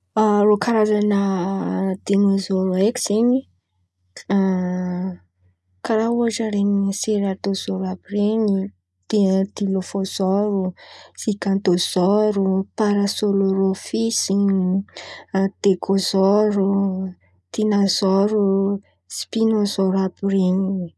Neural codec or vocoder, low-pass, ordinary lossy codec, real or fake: none; none; none; real